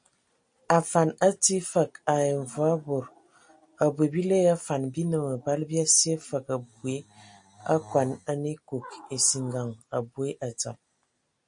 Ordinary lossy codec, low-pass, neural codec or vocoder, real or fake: MP3, 48 kbps; 9.9 kHz; none; real